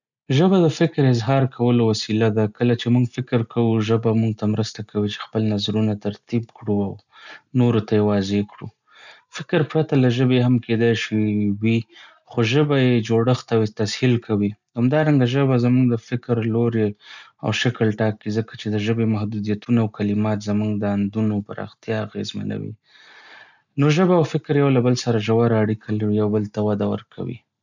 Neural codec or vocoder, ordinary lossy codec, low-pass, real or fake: none; none; 7.2 kHz; real